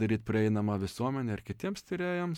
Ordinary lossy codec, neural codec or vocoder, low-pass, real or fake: MP3, 96 kbps; none; 19.8 kHz; real